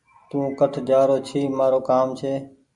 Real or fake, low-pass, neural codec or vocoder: real; 10.8 kHz; none